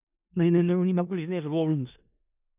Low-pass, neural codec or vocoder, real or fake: 3.6 kHz; codec, 16 kHz in and 24 kHz out, 0.4 kbps, LongCat-Audio-Codec, four codebook decoder; fake